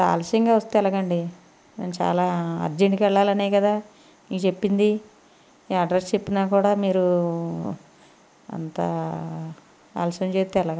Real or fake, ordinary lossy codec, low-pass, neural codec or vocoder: real; none; none; none